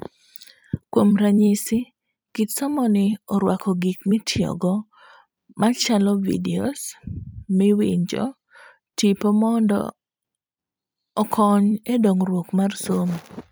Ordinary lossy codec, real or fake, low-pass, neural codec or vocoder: none; fake; none; vocoder, 44.1 kHz, 128 mel bands every 256 samples, BigVGAN v2